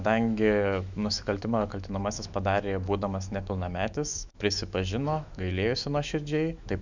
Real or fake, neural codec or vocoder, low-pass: fake; autoencoder, 48 kHz, 128 numbers a frame, DAC-VAE, trained on Japanese speech; 7.2 kHz